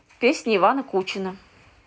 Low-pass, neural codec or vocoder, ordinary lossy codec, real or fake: none; none; none; real